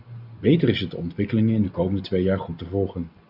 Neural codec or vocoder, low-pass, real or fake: none; 5.4 kHz; real